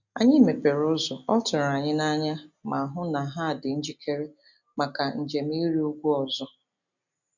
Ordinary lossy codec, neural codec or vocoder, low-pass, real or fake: none; none; 7.2 kHz; real